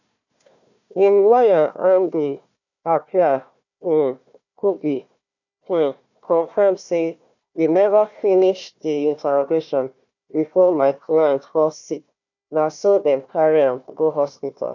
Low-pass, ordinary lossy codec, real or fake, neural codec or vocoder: 7.2 kHz; none; fake; codec, 16 kHz, 1 kbps, FunCodec, trained on Chinese and English, 50 frames a second